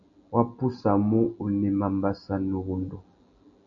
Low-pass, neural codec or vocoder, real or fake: 7.2 kHz; none; real